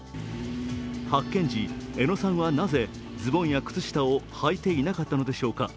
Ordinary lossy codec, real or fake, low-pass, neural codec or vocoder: none; real; none; none